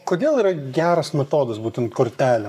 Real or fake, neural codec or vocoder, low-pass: fake; codec, 44.1 kHz, 7.8 kbps, Pupu-Codec; 14.4 kHz